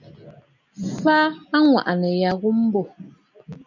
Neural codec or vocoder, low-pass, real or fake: none; 7.2 kHz; real